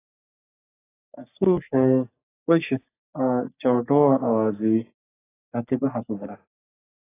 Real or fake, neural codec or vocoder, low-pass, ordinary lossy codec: fake; codec, 44.1 kHz, 3.4 kbps, Pupu-Codec; 3.6 kHz; AAC, 16 kbps